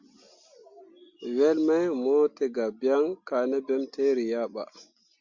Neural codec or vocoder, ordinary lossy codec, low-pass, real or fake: none; Opus, 64 kbps; 7.2 kHz; real